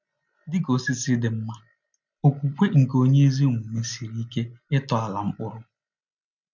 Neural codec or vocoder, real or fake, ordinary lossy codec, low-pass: none; real; none; 7.2 kHz